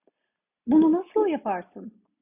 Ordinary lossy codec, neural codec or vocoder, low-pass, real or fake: AAC, 32 kbps; none; 3.6 kHz; real